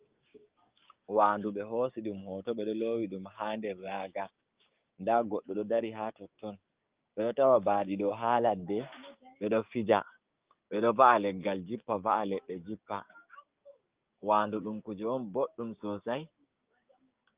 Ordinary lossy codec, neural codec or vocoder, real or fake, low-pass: Opus, 32 kbps; codec, 16 kHz, 6 kbps, DAC; fake; 3.6 kHz